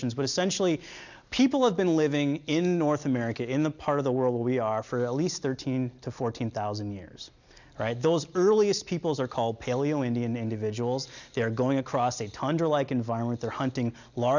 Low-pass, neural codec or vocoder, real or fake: 7.2 kHz; none; real